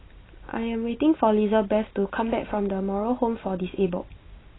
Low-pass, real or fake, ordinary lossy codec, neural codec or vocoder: 7.2 kHz; real; AAC, 16 kbps; none